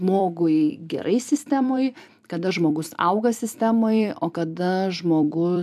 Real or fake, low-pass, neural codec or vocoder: fake; 14.4 kHz; vocoder, 44.1 kHz, 128 mel bands every 256 samples, BigVGAN v2